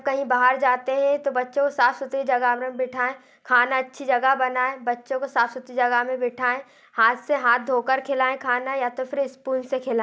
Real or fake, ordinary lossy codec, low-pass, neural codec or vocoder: real; none; none; none